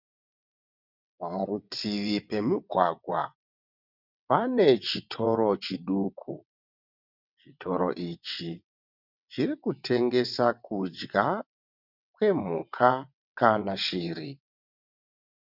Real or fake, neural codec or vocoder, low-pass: fake; vocoder, 22.05 kHz, 80 mel bands, WaveNeXt; 5.4 kHz